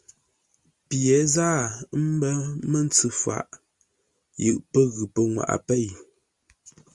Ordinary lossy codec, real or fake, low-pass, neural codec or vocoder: Opus, 64 kbps; real; 10.8 kHz; none